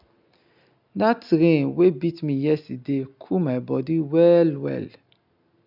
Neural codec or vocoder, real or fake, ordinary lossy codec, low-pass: none; real; none; 5.4 kHz